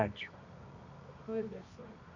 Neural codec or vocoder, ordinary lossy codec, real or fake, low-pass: codec, 24 kHz, 0.9 kbps, WavTokenizer, medium music audio release; none; fake; 7.2 kHz